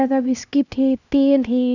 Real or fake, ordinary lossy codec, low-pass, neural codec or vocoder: fake; none; 7.2 kHz; codec, 16 kHz, 1 kbps, X-Codec, HuBERT features, trained on LibriSpeech